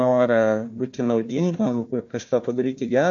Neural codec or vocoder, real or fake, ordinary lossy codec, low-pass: codec, 16 kHz, 1 kbps, FunCodec, trained on Chinese and English, 50 frames a second; fake; MP3, 48 kbps; 7.2 kHz